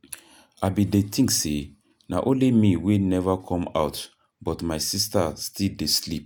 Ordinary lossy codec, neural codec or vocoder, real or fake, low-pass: none; none; real; none